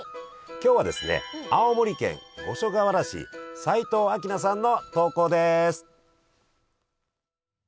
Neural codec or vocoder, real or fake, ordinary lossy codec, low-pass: none; real; none; none